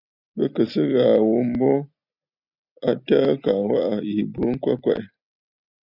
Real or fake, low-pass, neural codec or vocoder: real; 5.4 kHz; none